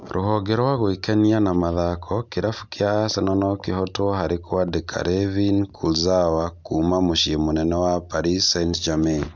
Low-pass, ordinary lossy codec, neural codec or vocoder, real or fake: none; none; none; real